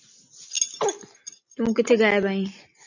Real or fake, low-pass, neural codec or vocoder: real; 7.2 kHz; none